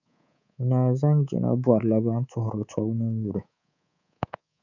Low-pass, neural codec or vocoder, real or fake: 7.2 kHz; codec, 24 kHz, 3.1 kbps, DualCodec; fake